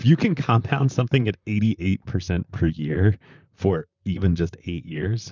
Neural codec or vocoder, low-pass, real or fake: vocoder, 44.1 kHz, 128 mel bands, Pupu-Vocoder; 7.2 kHz; fake